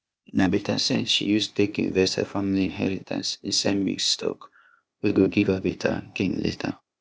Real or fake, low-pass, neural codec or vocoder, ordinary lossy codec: fake; none; codec, 16 kHz, 0.8 kbps, ZipCodec; none